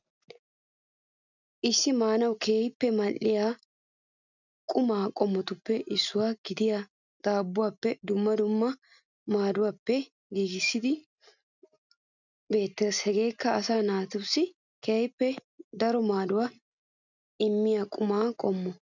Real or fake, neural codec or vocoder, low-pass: real; none; 7.2 kHz